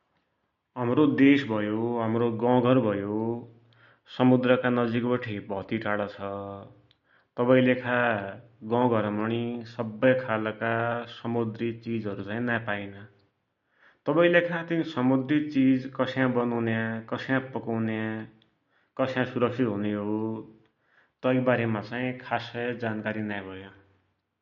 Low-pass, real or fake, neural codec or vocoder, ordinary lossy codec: 7.2 kHz; real; none; MP3, 48 kbps